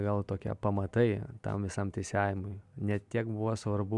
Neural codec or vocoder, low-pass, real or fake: none; 10.8 kHz; real